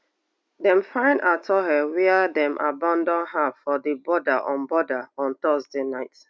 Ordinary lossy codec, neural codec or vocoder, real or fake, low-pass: none; none; real; none